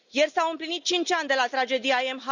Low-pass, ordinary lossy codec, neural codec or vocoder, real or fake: 7.2 kHz; none; none; real